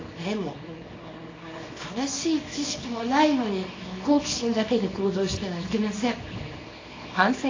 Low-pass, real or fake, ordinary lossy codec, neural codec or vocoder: 7.2 kHz; fake; AAC, 32 kbps; codec, 24 kHz, 0.9 kbps, WavTokenizer, small release